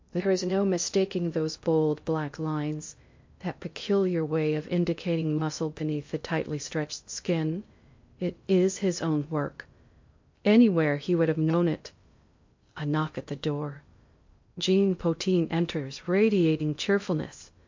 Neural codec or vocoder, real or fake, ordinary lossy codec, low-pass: codec, 16 kHz in and 24 kHz out, 0.6 kbps, FocalCodec, streaming, 2048 codes; fake; MP3, 48 kbps; 7.2 kHz